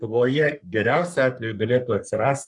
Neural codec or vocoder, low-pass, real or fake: codec, 44.1 kHz, 3.4 kbps, Pupu-Codec; 10.8 kHz; fake